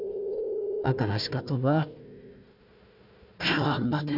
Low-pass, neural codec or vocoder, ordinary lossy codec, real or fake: 5.4 kHz; codec, 16 kHz, 1 kbps, FunCodec, trained on Chinese and English, 50 frames a second; none; fake